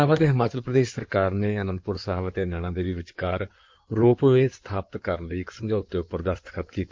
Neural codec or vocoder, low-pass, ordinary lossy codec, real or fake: codec, 16 kHz in and 24 kHz out, 2.2 kbps, FireRedTTS-2 codec; 7.2 kHz; Opus, 24 kbps; fake